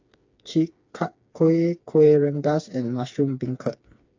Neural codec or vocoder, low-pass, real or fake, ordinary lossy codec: codec, 16 kHz, 4 kbps, FreqCodec, smaller model; 7.2 kHz; fake; AAC, 48 kbps